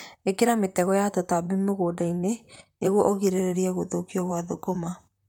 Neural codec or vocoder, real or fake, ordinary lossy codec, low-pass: vocoder, 44.1 kHz, 128 mel bands, Pupu-Vocoder; fake; MP3, 96 kbps; 19.8 kHz